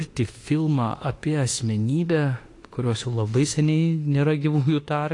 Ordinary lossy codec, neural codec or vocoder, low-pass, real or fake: AAC, 48 kbps; autoencoder, 48 kHz, 32 numbers a frame, DAC-VAE, trained on Japanese speech; 10.8 kHz; fake